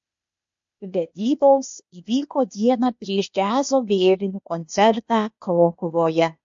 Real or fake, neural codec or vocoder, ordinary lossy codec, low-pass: fake; codec, 16 kHz, 0.8 kbps, ZipCodec; MP3, 48 kbps; 7.2 kHz